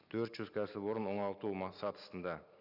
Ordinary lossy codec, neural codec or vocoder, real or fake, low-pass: none; none; real; 5.4 kHz